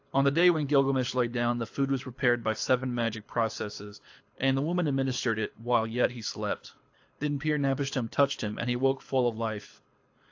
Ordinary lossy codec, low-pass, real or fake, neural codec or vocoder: AAC, 48 kbps; 7.2 kHz; fake; codec, 24 kHz, 6 kbps, HILCodec